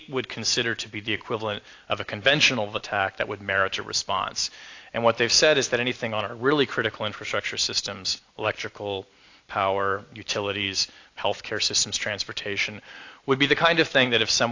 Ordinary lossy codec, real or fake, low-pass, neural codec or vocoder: AAC, 48 kbps; real; 7.2 kHz; none